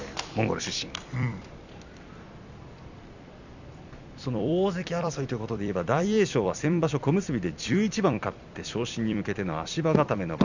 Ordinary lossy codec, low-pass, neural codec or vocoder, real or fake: none; 7.2 kHz; vocoder, 44.1 kHz, 128 mel bands every 256 samples, BigVGAN v2; fake